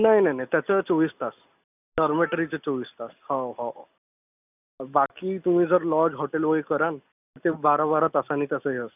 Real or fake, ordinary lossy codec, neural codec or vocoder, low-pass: real; none; none; 3.6 kHz